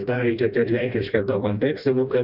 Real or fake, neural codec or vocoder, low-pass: fake; codec, 16 kHz, 1 kbps, FreqCodec, smaller model; 5.4 kHz